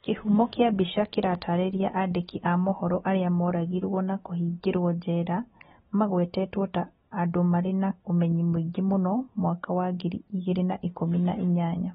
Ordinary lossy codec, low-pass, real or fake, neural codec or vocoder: AAC, 16 kbps; 19.8 kHz; real; none